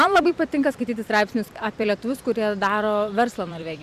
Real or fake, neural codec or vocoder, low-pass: fake; vocoder, 44.1 kHz, 128 mel bands every 256 samples, BigVGAN v2; 14.4 kHz